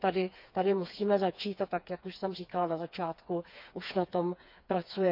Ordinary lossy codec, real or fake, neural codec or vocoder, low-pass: none; fake; codec, 16 kHz, 4 kbps, FreqCodec, smaller model; 5.4 kHz